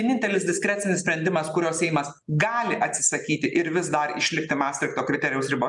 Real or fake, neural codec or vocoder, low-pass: real; none; 10.8 kHz